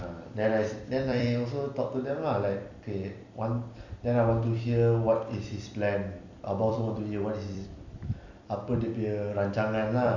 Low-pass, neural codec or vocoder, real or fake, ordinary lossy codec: 7.2 kHz; vocoder, 44.1 kHz, 128 mel bands every 256 samples, BigVGAN v2; fake; none